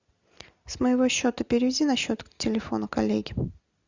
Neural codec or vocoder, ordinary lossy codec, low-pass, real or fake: none; Opus, 64 kbps; 7.2 kHz; real